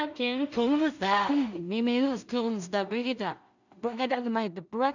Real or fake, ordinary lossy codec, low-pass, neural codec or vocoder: fake; none; 7.2 kHz; codec, 16 kHz in and 24 kHz out, 0.4 kbps, LongCat-Audio-Codec, two codebook decoder